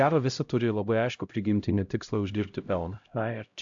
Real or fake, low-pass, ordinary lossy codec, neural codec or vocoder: fake; 7.2 kHz; AAC, 64 kbps; codec, 16 kHz, 0.5 kbps, X-Codec, HuBERT features, trained on LibriSpeech